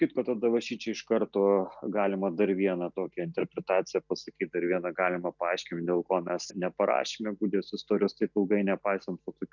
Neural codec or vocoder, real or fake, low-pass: none; real; 7.2 kHz